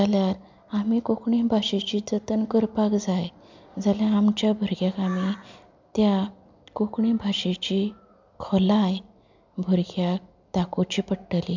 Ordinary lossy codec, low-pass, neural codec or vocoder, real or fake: MP3, 64 kbps; 7.2 kHz; none; real